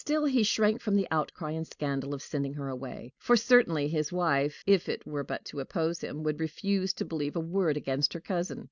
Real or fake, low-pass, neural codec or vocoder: real; 7.2 kHz; none